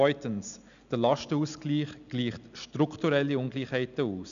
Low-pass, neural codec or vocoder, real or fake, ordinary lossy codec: 7.2 kHz; none; real; none